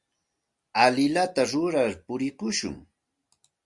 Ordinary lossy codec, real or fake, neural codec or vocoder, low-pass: Opus, 64 kbps; real; none; 10.8 kHz